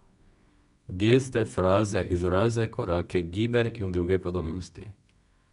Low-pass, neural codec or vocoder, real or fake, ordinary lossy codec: 10.8 kHz; codec, 24 kHz, 0.9 kbps, WavTokenizer, medium music audio release; fake; none